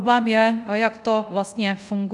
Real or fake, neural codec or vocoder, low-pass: fake; codec, 24 kHz, 0.5 kbps, DualCodec; 10.8 kHz